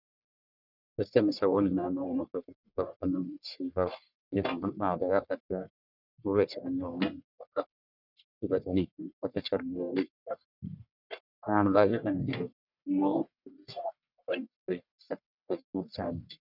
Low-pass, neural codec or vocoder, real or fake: 5.4 kHz; codec, 44.1 kHz, 1.7 kbps, Pupu-Codec; fake